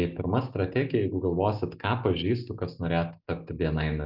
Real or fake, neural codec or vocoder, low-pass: real; none; 5.4 kHz